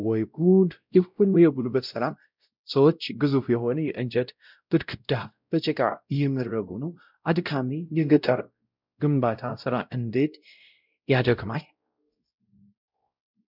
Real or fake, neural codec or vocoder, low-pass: fake; codec, 16 kHz, 0.5 kbps, X-Codec, WavLM features, trained on Multilingual LibriSpeech; 5.4 kHz